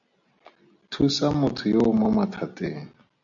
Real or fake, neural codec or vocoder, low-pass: real; none; 7.2 kHz